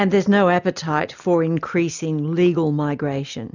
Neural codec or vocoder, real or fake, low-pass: none; real; 7.2 kHz